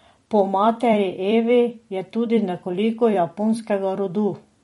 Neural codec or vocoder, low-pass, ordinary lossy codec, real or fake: vocoder, 44.1 kHz, 128 mel bands every 256 samples, BigVGAN v2; 19.8 kHz; MP3, 48 kbps; fake